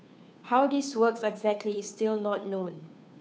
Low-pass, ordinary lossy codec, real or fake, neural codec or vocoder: none; none; fake; codec, 16 kHz, 2 kbps, FunCodec, trained on Chinese and English, 25 frames a second